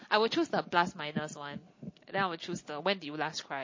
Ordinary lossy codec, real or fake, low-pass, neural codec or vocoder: MP3, 32 kbps; real; 7.2 kHz; none